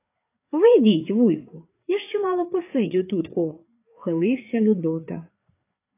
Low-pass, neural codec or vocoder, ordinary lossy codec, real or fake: 3.6 kHz; codec, 16 kHz, 4 kbps, FreqCodec, larger model; AAC, 24 kbps; fake